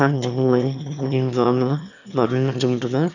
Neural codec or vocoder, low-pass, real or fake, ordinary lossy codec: autoencoder, 22.05 kHz, a latent of 192 numbers a frame, VITS, trained on one speaker; 7.2 kHz; fake; none